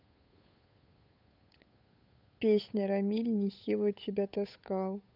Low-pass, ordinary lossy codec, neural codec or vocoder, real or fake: 5.4 kHz; none; codec, 16 kHz, 8 kbps, FunCodec, trained on Chinese and English, 25 frames a second; fake